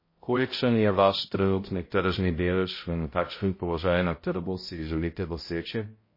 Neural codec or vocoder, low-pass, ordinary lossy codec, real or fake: codec, 16 kHz, 0.5 kbps, X-Codec, HuBERT features, trained on balanced general audio; 5.4 kHz; MP3, 24 kbps; fake